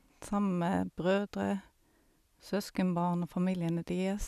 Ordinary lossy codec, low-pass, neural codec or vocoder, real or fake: none; 14.4 kHz; none; real